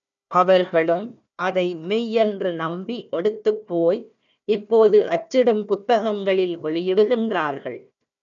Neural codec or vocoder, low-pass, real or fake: codec, 16 kHz, 1 kbps, FunCodec, trained on Chinese and English, 50 frames a second; 7.2 kHz; fake